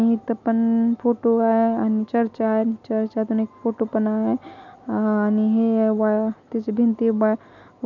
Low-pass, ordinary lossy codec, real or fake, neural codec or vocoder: 7.2 kHz; none; real; none